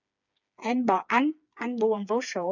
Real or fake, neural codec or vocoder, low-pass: fake; codec, 16 kHz, 4 kbps, FreqCodec, smaller model; 7.2 kHz